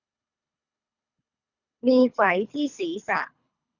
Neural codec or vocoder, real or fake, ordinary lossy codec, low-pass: codec, 24 kHz, 3 kbps, HILCodec; fake; none; 7.2 kHz